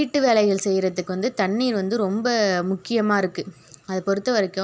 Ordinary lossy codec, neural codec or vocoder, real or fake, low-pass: none; none; real; none